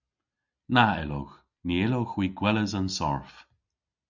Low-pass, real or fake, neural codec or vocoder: 7.2 kHz; real; none